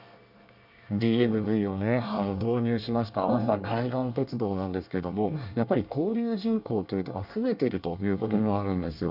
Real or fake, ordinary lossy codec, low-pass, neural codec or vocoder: fake; none; 5.4 kHz; codec, 24 kHz, 1 kbps, SNAC